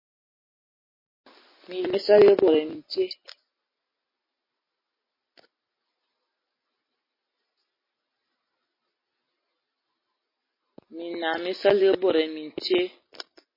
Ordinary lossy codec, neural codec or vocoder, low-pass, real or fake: MP3, 24 kbps; none; 5.4 kHz; real